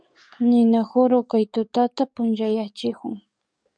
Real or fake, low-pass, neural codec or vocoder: fake; 9.9 kHz; codec, 44.1 kHz, 7.8 kbps, DAC